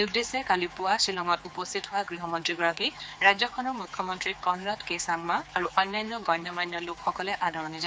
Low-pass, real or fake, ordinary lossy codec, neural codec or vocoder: none; fake; none; codec, 16 kHz, 4 kbps, X-Codec, HuBERT features, trained on general audio